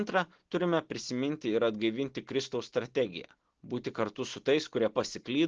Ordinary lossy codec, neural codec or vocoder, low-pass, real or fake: Opus, 16 kbps; none; 7.2 kHz; real